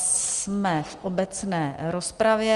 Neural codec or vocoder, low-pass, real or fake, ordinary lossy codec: none; 10.8 kHz; real; Opus, 32 kbps